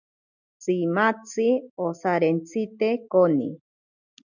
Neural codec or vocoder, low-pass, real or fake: none; 7.2 kHz; real